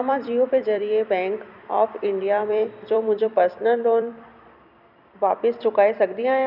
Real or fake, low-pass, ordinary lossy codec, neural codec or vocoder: fake; 5.4 kHz; none; vocoder, 44.1 kHz, 128 mel bands every 256 samples, BigVGAN v2